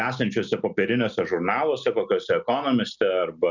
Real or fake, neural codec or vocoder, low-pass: real; none; 7.2 kHz